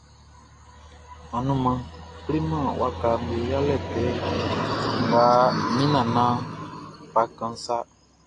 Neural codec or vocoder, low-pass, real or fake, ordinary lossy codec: none; 9.9 kHz; real; AAC, 48 kbps